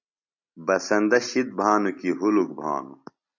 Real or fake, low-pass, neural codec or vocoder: real; 7.2 kHz; none